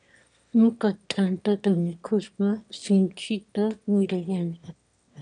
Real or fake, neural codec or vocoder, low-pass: fake; autoencoder, 22.05 kHz, a latent of 192 numbers a frame, VITS, trained on one speaker; 9.9 kHz